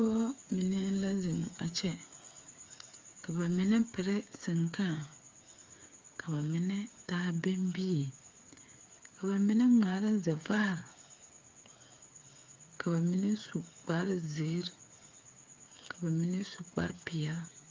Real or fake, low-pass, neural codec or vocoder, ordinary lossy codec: fake; 7.2 kHz; codec, 16 kHz, 8 kbps, FreqCodec, smaller model; Opus, 32 kbps